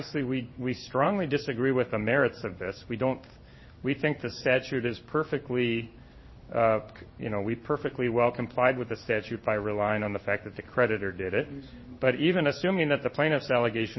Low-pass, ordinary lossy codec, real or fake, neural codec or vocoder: 7.2 kHz; MP3, 24 kbps; fake; codec, 16 kHz in and 24 kHz out, 1 kbps, XY-Tokenizer